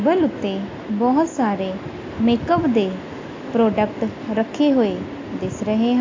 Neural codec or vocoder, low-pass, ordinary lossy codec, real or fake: none; 7.2 kHz; AAC, 32 kbps; real